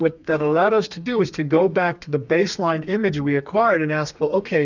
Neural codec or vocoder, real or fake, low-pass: codec, 32 kHz, 1.9 kbps, SNAC; fake; 7.2 kHz